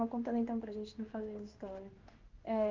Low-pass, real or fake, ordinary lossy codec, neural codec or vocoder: 7.2 kHz; fake; Opus, 24 kbps; codec, 16 kHz in and 24 kHz out, 1 kbps, XY-Tokenizer